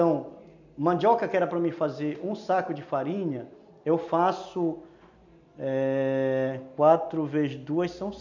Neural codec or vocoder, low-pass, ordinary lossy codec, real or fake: none; 7.2 kHz; none; real